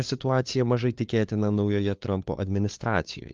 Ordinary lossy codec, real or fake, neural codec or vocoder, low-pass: Opus, 32 kbps; fake; codec, 16 kHz, 2 kbps, FunCodec, trained on LibriTTS, 25 frames a second; 7.2 kHz